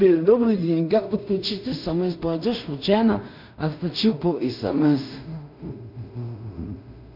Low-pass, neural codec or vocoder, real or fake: 5.4 kHz; codec, 16 kHz in and 24 kHz out, 0.4 kbps, LongCat-Audio-Codec, two codebook decoder; fake